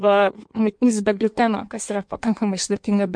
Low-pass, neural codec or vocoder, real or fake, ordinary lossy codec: 9.9 kHz; codec, 16 kHz in and 24 kHz out, 1.1 kbps, FireRedTTS-2 codec; fake; MP3, 64 kbps